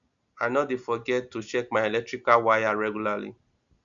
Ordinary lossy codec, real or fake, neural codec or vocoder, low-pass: none; real; none; 7.2 kHz